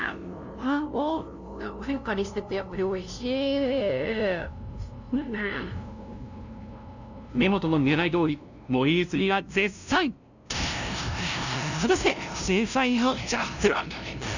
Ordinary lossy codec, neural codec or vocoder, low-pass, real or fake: none; codec, 16 kHz, 0.5 kbps, FunCodec, trained on LibriTTS, 25 frames a second; 7.2 kHz; fake